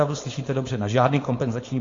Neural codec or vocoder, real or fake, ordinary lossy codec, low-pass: codec, 16 kHz, 4.8 kbps, FACodec; fake; AAC, 32 kbps; 7.2 kHz